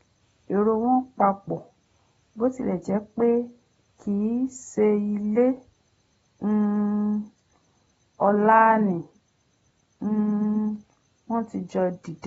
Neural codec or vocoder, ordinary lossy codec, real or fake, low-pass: none; AAC, 24 kbps; real; 19.8 kHz